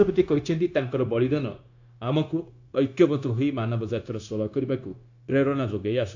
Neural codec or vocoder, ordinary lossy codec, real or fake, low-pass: codec, 16 kHz, 0.9 kbps, LongCat-Audio-Codec; AAC, 48 kbps; fake; 7.2 kHz